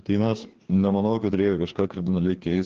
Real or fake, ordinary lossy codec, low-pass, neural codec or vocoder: fake; Opus, 16 kbps; 7.2 kHz; codec, 16 kHz, 2 kbps, FreqCodec, larger model